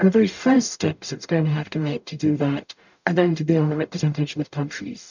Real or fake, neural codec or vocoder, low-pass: fake; codec, 44.1 kHz, 0.9 kbps, DAC; 7.2 kHz